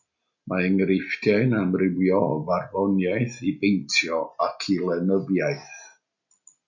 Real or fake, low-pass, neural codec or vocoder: real; 7.2 kHz; none